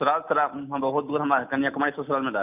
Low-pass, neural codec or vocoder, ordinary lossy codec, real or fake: 3.6 kHz; none; none; real